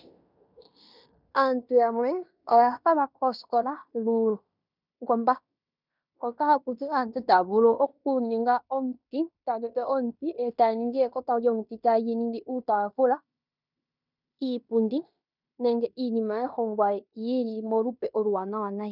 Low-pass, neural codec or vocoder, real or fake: 5.4 kHz; codec, 16 kHz in and 24 kHz out, 0.9 kbps, LongCat-Audio-Codec, fine tuned four codebook decoder; fake